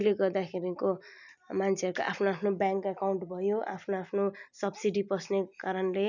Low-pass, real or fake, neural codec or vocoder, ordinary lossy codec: 7.2 kHz; real; none; none